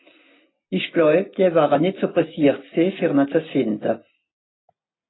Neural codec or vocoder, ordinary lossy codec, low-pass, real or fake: none; AAC, 16 kbps; 7.2 kHz; real